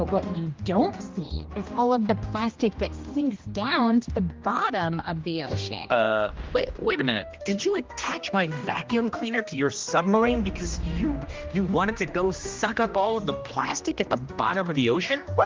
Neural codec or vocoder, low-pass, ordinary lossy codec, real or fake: codec, 16 kHz, 1 kbps, X-Codec, HuBERT features, trained on general audio; 7.2 kHz; Opus, 24 kbps; fake